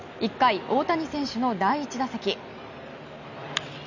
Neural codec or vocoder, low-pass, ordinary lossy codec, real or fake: none; 7.2 kHz; none; real